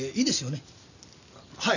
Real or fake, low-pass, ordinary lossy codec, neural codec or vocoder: real; 7.2 kHz; none; none